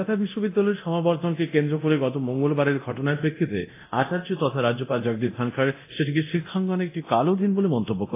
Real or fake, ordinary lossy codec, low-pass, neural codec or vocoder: fake; AAC, 24 kbps; 3.6 kHz; codec, 24 kHz, 0.9 kbps, DualCodec